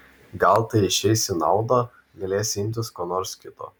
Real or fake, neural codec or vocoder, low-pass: real; none; 19.8 kHz